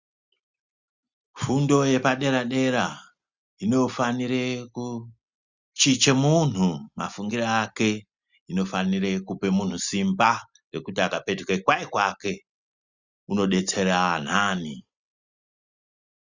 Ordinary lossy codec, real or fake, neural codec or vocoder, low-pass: Opus, 64 kbps; real; none; 7.2 kHz